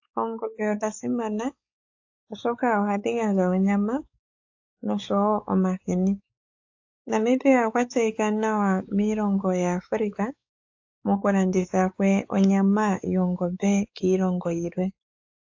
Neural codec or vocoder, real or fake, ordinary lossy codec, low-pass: codec, 16 kHz, 4 kbps, X-Codec, WavLM features, trained on Multilingual LibriSpeech; fake; AAC, 48 kbps; 7.2 kHz